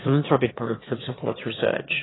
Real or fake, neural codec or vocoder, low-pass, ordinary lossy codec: fake; autoencoder, 22.05 kHz, a latent of 192 numbers a frame, VITS, trained on one speaker; 7.2 kHz; AAC, 16 kbps